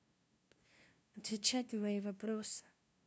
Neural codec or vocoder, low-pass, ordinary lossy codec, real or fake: codec, 16 kHz, 0.5 kbps, FunCodec, trained on LibriTTS, 25 frames a second; none; none; fake